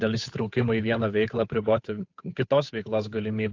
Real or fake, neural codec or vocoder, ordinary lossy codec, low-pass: fake; codec, 16 kHz, 8 kbps, FunCodec, trained on Chinese and English, 25 frames a second; AAC, 48 kbps; 7.2 kHz